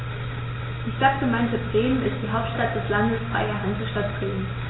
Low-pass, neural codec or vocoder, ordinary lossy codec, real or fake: 7.2 kHz; none; AAC, 16 kbps; real